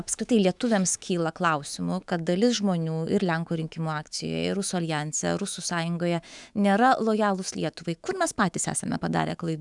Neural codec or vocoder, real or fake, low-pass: autoencoder, 48 kHz, 128 numbers a frame, DAC-VAE, trained on Japanese speech; fake; 10.8 kHz